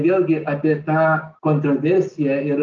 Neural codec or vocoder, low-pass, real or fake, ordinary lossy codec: none; 7.2 kHz; real; Opus, 24 kbps